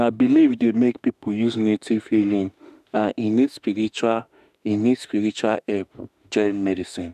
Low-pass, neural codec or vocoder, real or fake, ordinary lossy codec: 14.4 kHz; autoencoder, 48 kHz, 32 numbers a frame, DAC-VAE, trained on Japanese speech; fake; none